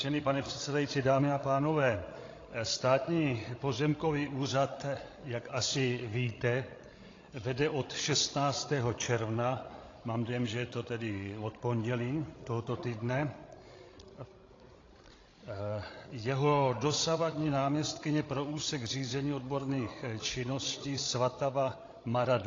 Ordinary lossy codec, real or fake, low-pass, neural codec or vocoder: AAC, 32 kbps; fake; 7.2 kHz; codec, 16 kHz, 16 kbps, FreqCodec, larger model